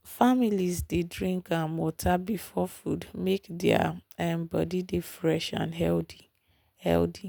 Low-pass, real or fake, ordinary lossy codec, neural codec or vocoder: none; real; none; none